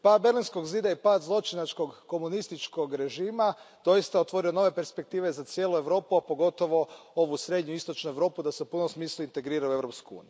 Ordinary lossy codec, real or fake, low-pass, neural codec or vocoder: none; real; none; none